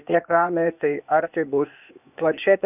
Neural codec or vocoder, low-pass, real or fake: codec, 16 kHz, 0.8 kbps, ZipCodec; 3.6 kHz; fake